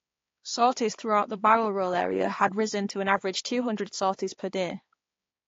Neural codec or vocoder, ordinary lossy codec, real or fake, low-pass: codec, 16 kHz, 4 kbps, X-Codec, HuBERT features, trained on balanced general audio; AAC, 32 kbps; fake; 7.2 kHz